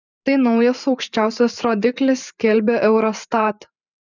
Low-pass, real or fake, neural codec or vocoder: 7.2 kHz; fake; vocoder, 44.1 kHz, 128 mel bands every 512 samples, BigVGAN v2